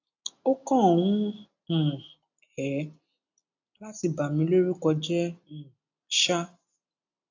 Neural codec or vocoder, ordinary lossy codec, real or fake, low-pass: none; AAC, 48 kbps; real; 7.2 kHz